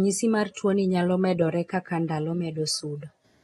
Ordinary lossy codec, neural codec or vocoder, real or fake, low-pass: AAC, 32 kbps; none; real; 19.8 kHz